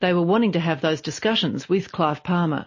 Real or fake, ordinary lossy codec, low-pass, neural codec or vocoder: real; MP3, 32 kbps; 7.2 kHz; none